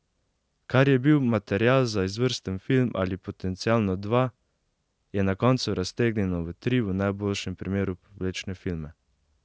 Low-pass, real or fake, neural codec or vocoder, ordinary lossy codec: none; real; none; none